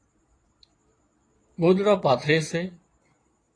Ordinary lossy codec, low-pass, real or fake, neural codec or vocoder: AAC, 32 kbps; 9.9 kHz; fake; vocoder, 22.05 kHz, 80 mel bands, Vocos